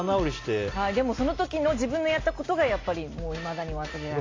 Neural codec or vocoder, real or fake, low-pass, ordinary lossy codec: none; real; 7.2 kHz; AAC, 32 kbps